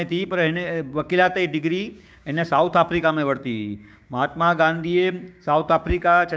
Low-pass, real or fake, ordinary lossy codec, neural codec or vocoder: none; fake; none; codec, 16 kHz, 6 kbps, DAC